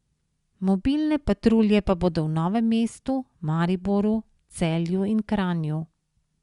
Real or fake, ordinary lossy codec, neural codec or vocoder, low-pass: real; Opus, 64 kbps; none; 10.8 kHz